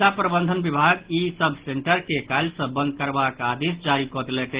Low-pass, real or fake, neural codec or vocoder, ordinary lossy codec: 3.6 kHz; real; none; Opus, 16 kbps